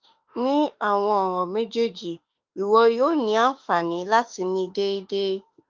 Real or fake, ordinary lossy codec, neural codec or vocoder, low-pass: fake; Opus, 32 kbps; autoencoder, 48 kHz, 32 numbers a frame, DAC-VAE, trained on Japanese speech; 7.2 kHz